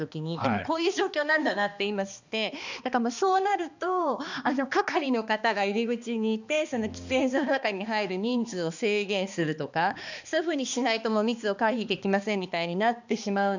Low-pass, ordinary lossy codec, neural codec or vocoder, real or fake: 7.2 kHz; none; codec, 16 kHz, 2 kbps, X-Codec, HuBERT features, trained on balanced general audio; fake